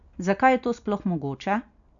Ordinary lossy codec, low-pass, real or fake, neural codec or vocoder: none; 7.2 kHz; real; none